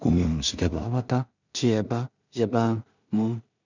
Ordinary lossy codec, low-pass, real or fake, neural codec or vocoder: none; 7.2 kHz; fake; codec, 16 kHz in and 24 kHz out, 0.4 kbps, LongCat-Audio-Codec, two codebook decoder